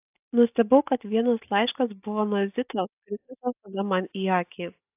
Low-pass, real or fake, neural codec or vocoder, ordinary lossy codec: 3.6 kHz; real; none; AAC, 32 kbps